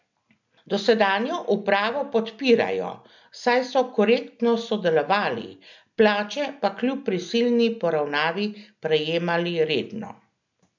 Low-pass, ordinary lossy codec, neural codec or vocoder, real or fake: 7.2 kHz; none; none; real